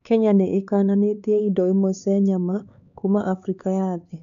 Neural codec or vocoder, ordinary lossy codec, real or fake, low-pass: codec, 16 kHz, 4 kbps, X-Codec, HuBERT features, trained on LibriSpeech; none; fake; 7.2 kHz